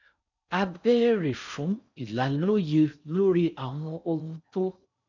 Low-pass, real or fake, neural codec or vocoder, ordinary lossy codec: 7.2 kHz; fake; codec, 16 kHz in and 24 kHz out, 0.6 kbps, FocalCodec, streaming, 4096 codes; none